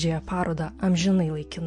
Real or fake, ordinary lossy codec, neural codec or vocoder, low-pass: real; MP3, 48 kbps; none; 9.9 kHz